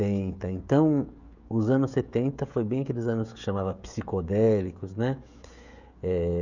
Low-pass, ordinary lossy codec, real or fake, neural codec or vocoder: 7.2 kHz; none; fake; codec, 16 kHz, 16 kbps, FreqCodec, smaller model